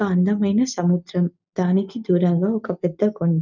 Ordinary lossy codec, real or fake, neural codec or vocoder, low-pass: none; real; none; 7.2 kHz